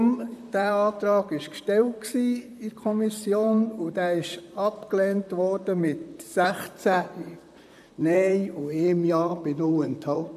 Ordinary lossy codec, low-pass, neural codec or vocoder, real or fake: none; 14.4 kHz; vocoder, 44.1 kHz, 128 mel bands, Pupu-Vocoder; fake